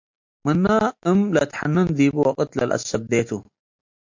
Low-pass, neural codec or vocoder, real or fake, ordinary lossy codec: 7.2 kHz; none; real; MP3, 48 kbps